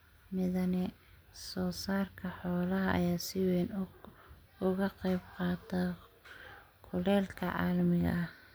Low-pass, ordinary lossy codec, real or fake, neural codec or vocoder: none; none; real; none